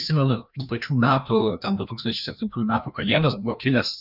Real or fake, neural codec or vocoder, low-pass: fake; codec, 16 kHz, 1 kbps, FreqCodec, larger model; 5.4 kHz